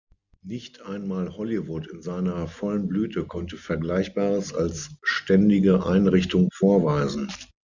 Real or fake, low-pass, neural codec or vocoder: real; 7.2 kHz; none